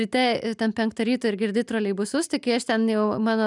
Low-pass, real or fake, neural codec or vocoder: 10.8 kHz; real; none